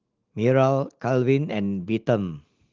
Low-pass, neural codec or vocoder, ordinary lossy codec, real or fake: 7.2 kHz; none; Opus, 16 kbps; real